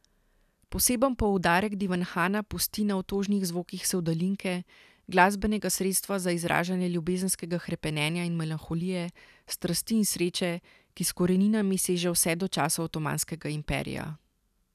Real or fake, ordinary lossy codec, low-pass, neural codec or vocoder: real; none; 14.4 kHz; none